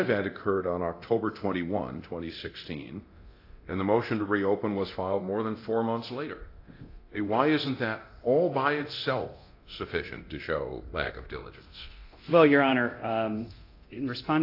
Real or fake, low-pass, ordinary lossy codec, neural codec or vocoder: fake; 5.4 kHz; AAC, 32 kbps; codec, 24 kHz, 0.9 kbps, DualCodec